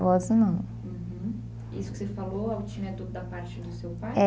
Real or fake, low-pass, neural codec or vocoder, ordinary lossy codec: real; none; none; none